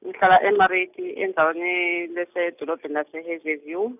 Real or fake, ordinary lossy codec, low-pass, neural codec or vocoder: real; none; 3.6 kHz; none